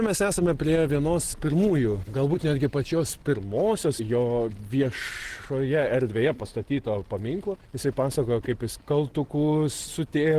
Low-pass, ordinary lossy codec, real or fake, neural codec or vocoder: 14.4 kHz; Opus, 16 kbps; real; none